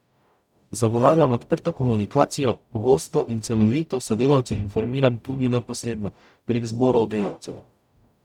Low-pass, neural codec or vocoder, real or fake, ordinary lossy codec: 19.8 kHz; codec, 44.1 kHz, 0.9 kbps, DAC; fake; none